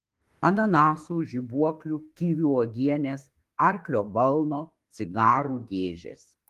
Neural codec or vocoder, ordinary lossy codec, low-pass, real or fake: autoencoder, 48 kHz, 32 numbers a frame, DAC-VAE, trained on Japanese speech; Opus, 24 kbps; 14.4 kHz; fake